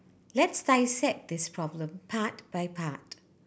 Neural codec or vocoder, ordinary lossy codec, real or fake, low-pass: none; none; real; none